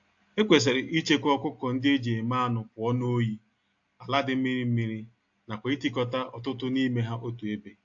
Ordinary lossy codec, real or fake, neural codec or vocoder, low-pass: AAC, 48 kbps; real; none; 7.2 kHz